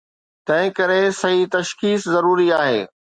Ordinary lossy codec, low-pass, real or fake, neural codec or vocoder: Opus, 64 kbps; 9.9 kHz; real; none